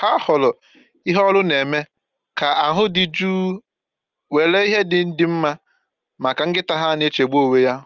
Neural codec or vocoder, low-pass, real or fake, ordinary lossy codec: none; 7.2 kHz; real; Opus, 24 kbps